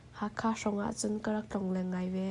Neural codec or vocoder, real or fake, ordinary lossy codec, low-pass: none; real; MP3, 96 kbps; 10.8 kHz